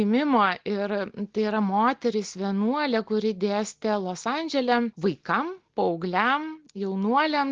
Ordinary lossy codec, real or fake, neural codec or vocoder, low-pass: Opus, 16 kbps; real; none; 7.2 kHz